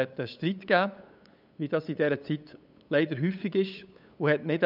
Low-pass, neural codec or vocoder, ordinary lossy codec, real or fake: 5.4 kHz; codec, 16 kHz, 8 kbps, FunCodec, trained on LibriTTS, 25 frames a second; none; fake